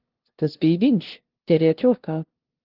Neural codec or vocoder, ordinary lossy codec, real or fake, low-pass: codec, 16 kHz, 0.5 kbps, FunCodec, trained on LibriTTS, 25 frames a second; Opus, 16 kbps; fake; 5.4 kHz